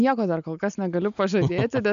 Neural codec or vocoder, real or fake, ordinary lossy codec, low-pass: none; real; AAC, 96 kbps; 7.2 kHz